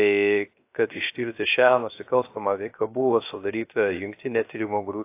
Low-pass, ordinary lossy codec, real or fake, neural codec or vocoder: 3.6 kHz; AAC, 24 kbps; fake; codec, 16 kHz, 0.3 kbps, FocalCodec